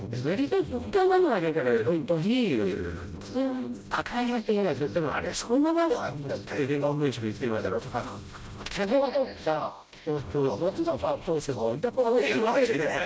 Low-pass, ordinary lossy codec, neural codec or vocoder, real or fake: none; none; codec, 16 kHz, 0.5 kbps, FreqCodec, smaller model; fake